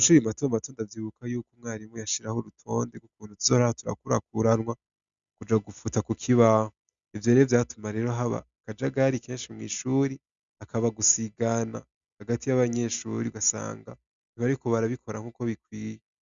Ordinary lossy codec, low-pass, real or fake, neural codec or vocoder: Opus, 64 kbps; 7.2 kHz; real; none